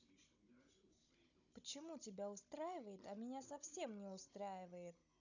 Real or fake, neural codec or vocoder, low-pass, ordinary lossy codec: fake; codec, 16 kHz, 16 kbps, FunCodec, trained on Chinese and English, 50 frames a second; 7.2 kHz; none